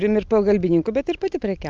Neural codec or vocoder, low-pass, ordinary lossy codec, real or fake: none; 7.2 kHz; Opus, 24 kbps; real